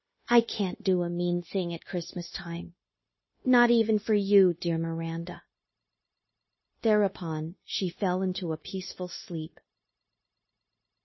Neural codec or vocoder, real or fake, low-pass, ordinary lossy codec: codec, 16 kHz, 0.9 kbps, LongCat-Audio-Codec; fake; 7.2 kHz; MP3, 24 kbps